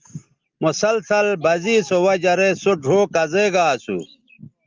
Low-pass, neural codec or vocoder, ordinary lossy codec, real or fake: 7.2 kHz; none; Opus, 32 kbps; real